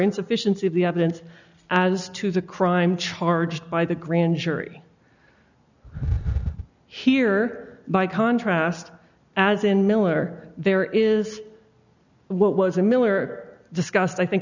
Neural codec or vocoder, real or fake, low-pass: none; real; 7.2 kHz